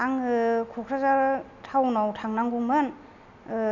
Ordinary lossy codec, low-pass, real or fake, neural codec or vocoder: none; 7.2 kHz; real; none